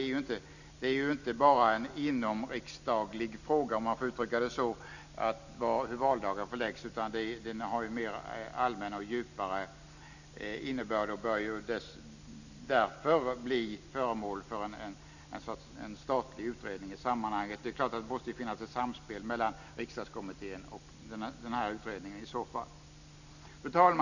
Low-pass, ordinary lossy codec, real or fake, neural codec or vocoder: 7.2 kHz; none; real; none